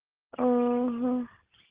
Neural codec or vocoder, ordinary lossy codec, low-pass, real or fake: none; Opus, 16 kbps; 3.6 kHz; real